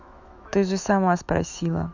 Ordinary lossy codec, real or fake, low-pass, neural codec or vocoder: none; fake; 7.2 kHz; autoencoder, 48 kHz, 128 numbers a frame, DAC-VAE, trained on Japanese speech